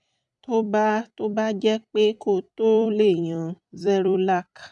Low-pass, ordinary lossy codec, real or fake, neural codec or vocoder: 10.8 kHz; none; fake; vocoder, 44.1 kHz, 128 mel bands every 256 samples, BigVGAN v2